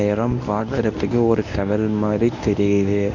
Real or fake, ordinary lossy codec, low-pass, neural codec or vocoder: fake; none; 7.2 kHz; codec, 24 kHz, 0.9 kbps, WavTokenizer, medium speech release version 1